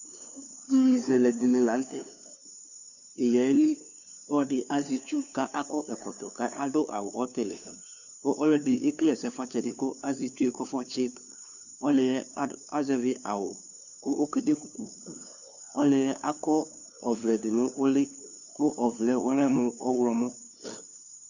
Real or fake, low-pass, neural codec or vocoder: fake; 7.2 kHz; codec, 16 kHz, 2 kbps, FunCodec, trained on LibriTTS, 25 frames a second